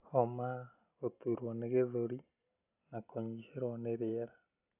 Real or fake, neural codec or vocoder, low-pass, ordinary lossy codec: real; none; 3.6 kHz; none